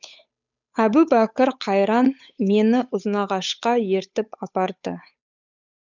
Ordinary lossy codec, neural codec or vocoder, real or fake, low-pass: none; codec, 16 kHz, 8 kbps, FunCodec, trained on Chinese and English, 25 frames a second; fake; 7.2 kHz